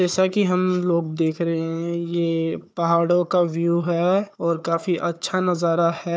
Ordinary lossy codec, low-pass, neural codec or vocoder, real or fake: none; none; codec, 16 kHz, 4 kbps, FunCodec, trained on Chinese and English, 50 frames a second; fake